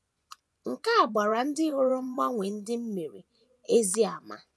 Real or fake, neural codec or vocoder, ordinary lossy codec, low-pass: fake; vocoder, 24 kHz, 100 mel bands, Vocos; none; none